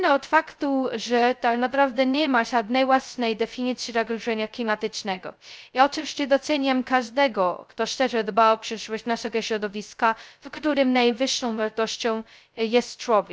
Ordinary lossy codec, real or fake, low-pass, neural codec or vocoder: none; fake; none; codec, 16 kHz, 0.2 kbps, FocalCodec